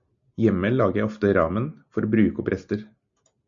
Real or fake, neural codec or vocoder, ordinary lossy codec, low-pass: real; none; MP3, 64 kbps; 7.2 kHz